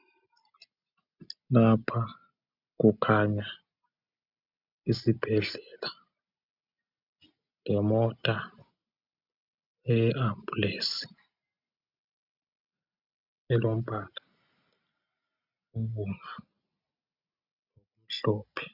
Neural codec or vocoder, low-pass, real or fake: none; 5.4 kHz; real